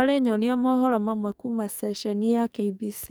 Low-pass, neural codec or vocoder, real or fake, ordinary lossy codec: none; codec, 44.1 kHz, 2.6 kbps, SNAC; fake; none